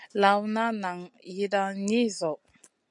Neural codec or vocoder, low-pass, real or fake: none; 10.8 kHz; real